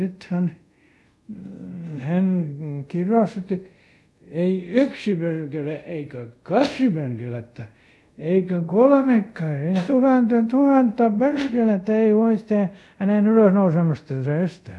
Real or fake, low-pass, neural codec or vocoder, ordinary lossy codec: fake; none; codec, 24 kHz, 0.5 kbps, DualCodec; none